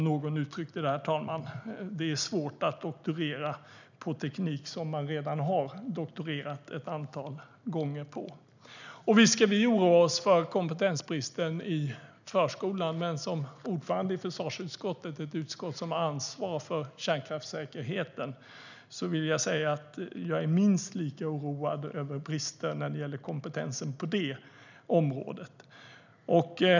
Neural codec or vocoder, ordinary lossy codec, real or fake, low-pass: none; none; real; 7.2 kHz